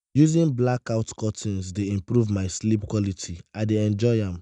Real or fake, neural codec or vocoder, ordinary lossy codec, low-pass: real; none; none; 10.8 kHz